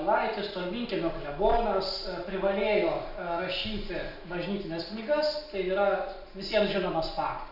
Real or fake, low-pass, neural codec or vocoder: real; 5.4 kHz; none